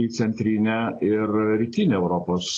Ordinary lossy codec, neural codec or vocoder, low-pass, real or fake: AAC, 32 kbps; none; 9.9 kHz; real